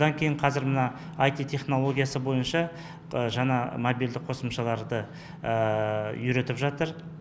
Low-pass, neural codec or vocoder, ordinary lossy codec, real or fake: none; none; none; real